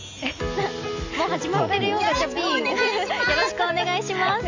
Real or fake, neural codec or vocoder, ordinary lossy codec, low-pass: real; none; AAC, 48 kbps; 7.2 kHz